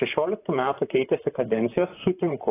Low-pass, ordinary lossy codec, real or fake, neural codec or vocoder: 3.6 kHz; AAC, 16 kbps; fake; vocoder, 44.1 kHz, 128 mel bands, Pupu-Vocoder